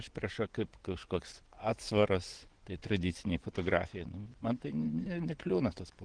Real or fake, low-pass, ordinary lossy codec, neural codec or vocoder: fake; 9.9 kHz; Opus, 16 kbps; vocoder, 24 kHz, 100 mel bands, Vocos